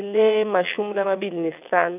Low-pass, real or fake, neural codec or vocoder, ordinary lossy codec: 3.6 kHz; fake; vocoder, 22.05 kHz, 80 mel bands, WaveNeXt; none